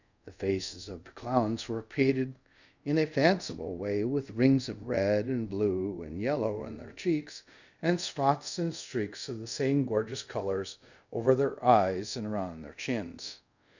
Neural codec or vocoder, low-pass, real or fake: codec, 24 kHz, 0.5 kbps, DualCodec; 7.2 kHz; fake